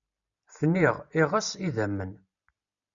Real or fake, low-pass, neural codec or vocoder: real; 7.2 kHz; none